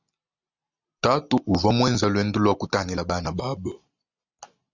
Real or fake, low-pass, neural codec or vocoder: real; 7.2 kHz; none